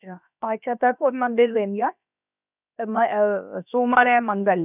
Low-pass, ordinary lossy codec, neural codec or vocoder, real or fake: 3.6 kHz; none; codec, 16 kHz, about 1 kbps, DyCAST, with the encoder's durations; fake